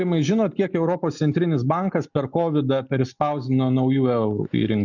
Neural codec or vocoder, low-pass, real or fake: none; 7.2 kHz; real